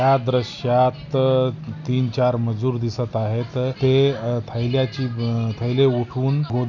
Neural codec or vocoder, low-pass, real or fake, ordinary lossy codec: none; 7.2 kHz; real; none